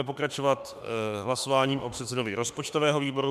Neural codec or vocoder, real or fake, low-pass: autoencoder, 48 kHz, 32 numbers a frame, DAC-VAE, trained on Japanese speech; fake; 14.4 kHz